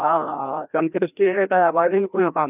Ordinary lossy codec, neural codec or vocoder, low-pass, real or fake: none; codec, 16 kHz, 1 kbps, FreqCodec, larger model; 3.6 kHz; fake